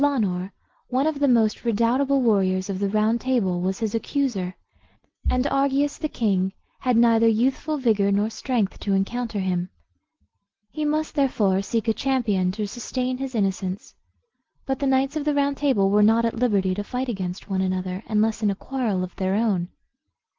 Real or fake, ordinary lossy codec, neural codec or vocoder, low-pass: real; Opus, 16 kbps; none; 7.2 kHz